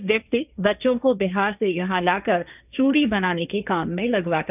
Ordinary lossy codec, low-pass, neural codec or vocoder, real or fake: none; 3.6 kHz; codec, 16 kHz, 1.1 kbps, Voila-Tokenizer; fake